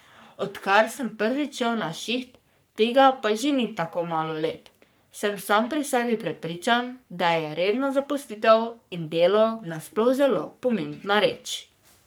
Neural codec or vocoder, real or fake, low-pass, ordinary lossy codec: codec, 44.1 kHz, 3.4 kbps, Pupu-Codec; fake; none; none